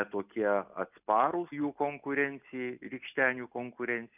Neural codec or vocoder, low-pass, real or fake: none; 3.6 kHz; real